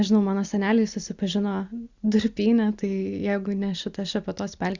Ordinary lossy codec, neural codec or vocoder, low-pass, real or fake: Opus, 64 kbps; none; 7.2 kHz; real